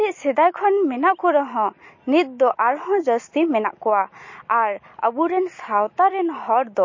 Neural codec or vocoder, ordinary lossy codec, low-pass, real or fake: none; MP3, 32 kbps; 7.2 kHz; real